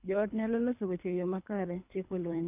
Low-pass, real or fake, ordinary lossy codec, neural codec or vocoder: 3.6 kHz; fake; none; codec, 24 kHz, 3 kbps, HILCodec